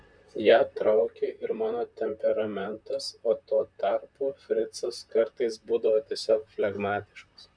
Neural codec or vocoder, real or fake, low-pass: vocoder, 44.1 kHz, 128 mel bands, Pupu-Vocoder; fake; 9.9 kHz